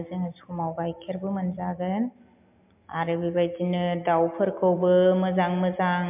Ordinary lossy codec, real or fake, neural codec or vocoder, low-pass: MP3, 32 kbps; real; none; 3.6 kHz